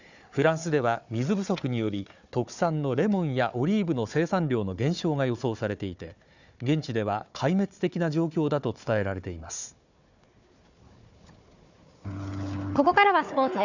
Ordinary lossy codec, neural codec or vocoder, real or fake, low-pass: none; codec, 16 kHz, 4 kbps, FunCodec, trained on Chinese and English, 50 frames a second; fake; 7.2 kHz